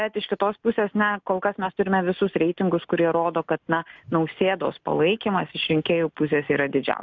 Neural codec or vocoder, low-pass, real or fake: none; 7.2 kHz; real